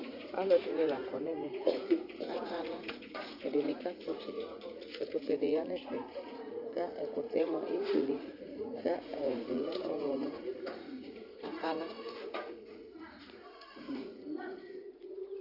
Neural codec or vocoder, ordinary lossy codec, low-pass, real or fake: vocoder, 44.1 kHz, 80 mel bands, Vocos; Opus, 64 kbps; 5.4 kHz; fake